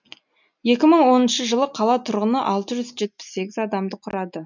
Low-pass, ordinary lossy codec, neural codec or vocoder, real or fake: 7.2 kHz; none; none; real